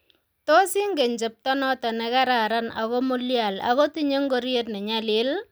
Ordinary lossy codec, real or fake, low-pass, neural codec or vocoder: none; real; none; none